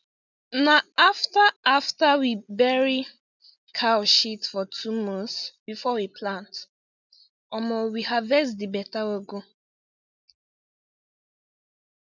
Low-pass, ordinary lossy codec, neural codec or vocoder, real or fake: 7.2 kHz; none; none; real